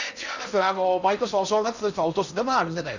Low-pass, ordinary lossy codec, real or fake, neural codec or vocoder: 7.2 kHz; none; fake; codec, 16 kHz in and 24 kHz out, 0.6 kbps, FocalCodec, streaming, 4096 codes